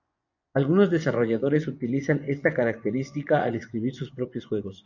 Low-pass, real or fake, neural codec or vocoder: 7.2 kHz; fake; vocoder, 24 kHz, 100 mel bands, Vocos